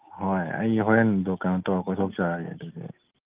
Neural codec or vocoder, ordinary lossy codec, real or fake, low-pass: none; Opus, 24 kbps; real; 3.6 kHz